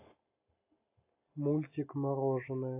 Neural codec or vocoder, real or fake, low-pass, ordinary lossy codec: none; real; 3.6 kHz; AAC, 24 kbps